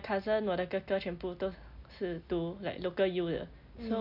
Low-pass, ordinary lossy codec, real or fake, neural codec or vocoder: 5.4 kHz; none; real; none